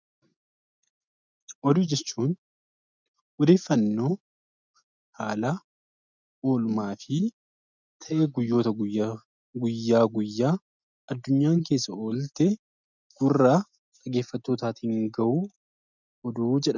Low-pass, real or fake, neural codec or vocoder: 7.2 kHz; real; none